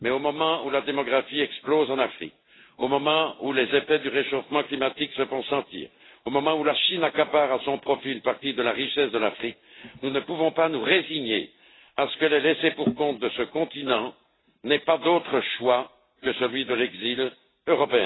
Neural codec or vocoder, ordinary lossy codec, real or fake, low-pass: none; AAC, 16 kbps; real; 7.2 kHz